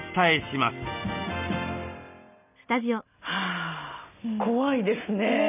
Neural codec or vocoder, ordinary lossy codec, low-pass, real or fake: none; none; 3.6 kHz; real